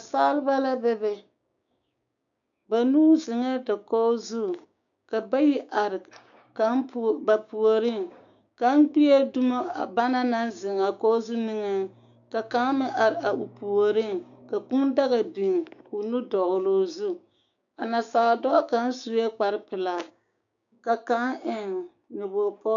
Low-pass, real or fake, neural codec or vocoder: 7.2 kHz; fake; codec, 16 kHz, 6 kbps, DAC